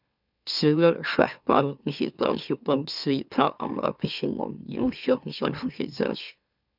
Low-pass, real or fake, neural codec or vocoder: 5.4 kHz; fake; autoencoder, 44.1 kHz, a latent of 192 numbers a frame, MeloTTS